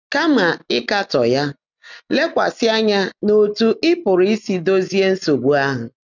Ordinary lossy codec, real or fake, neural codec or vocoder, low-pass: none; real; none; 7.2 kHz